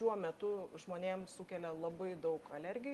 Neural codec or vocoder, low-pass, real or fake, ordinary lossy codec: none; 14.4 kHz; real; Opus, 24 kbps